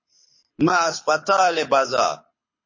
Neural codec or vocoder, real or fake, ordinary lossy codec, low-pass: codec, 24 kHz, 6 kbps, HILCodec; fake; MP3, 32 kbps; 7.2 kHz